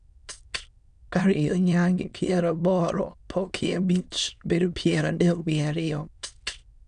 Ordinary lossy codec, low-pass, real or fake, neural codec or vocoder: none; 9.9 kHz; fake; autoencoder, 22.05 kHz, a latent of 192 numbers a frame, VITS, trained on many speakers